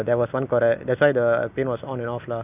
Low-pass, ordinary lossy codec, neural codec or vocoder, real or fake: 3.6 kHz; none; none; real